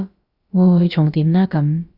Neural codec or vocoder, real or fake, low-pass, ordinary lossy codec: codec, 16 kHz, about 1 kbps, DyCAST, with the encoder's durations; fake; 5.4 kHz; Opus, 64 kbps